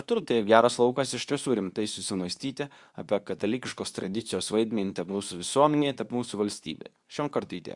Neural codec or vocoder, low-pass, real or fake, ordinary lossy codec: codec, 24 kHz, 0.9 kbps, WavTokenizer, medium speech release version 2; 10.8 kHz; fake; Opus, 64 kbps